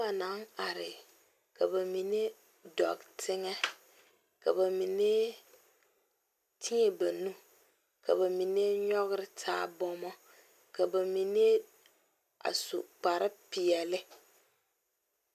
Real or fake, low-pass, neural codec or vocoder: real; 14.4 kHz; none